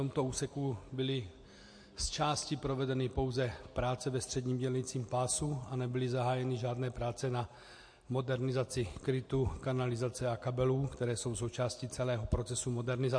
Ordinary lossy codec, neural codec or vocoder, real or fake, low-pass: MP3, 48 kbps; none; real; 9.9 kHz